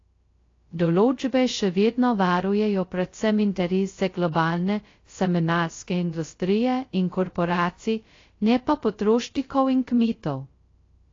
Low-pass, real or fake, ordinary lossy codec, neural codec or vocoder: 7.2 kHz; fake; AAC, 32 kbps; codec, 16 kHz, 0.2 kbps, FocalCodec